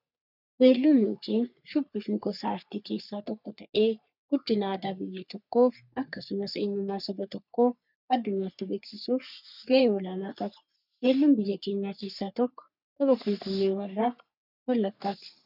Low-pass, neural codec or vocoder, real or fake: 5.4 kHz; codec, 44.1 kHz, 3.4 kbps, Pupu-Codec; fake